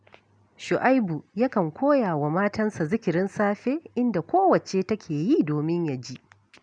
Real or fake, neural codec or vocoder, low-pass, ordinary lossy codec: real; none; 9.9 kHz; none